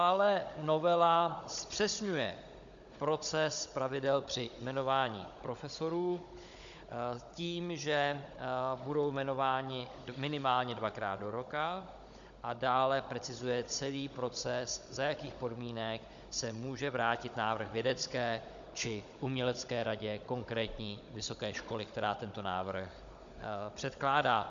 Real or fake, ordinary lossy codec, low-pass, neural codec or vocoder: fake; Opus, 64 kbps; 7.2 kHz; codec, 16 kHz, 16 kbps, FunCodec, trained on Chinese and English, 50 frames a second